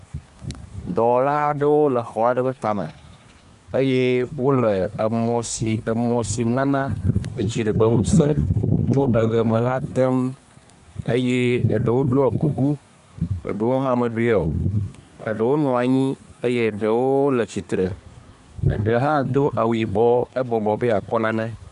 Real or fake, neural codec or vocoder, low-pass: fake; codec, 24 kHz, 1 kbps, SNAC; 10.8 kHz